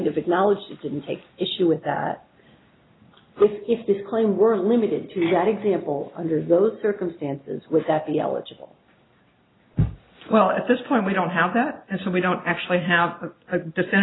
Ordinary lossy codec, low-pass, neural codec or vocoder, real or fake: AAC, 16 kbps; 7.2 kHz; none; real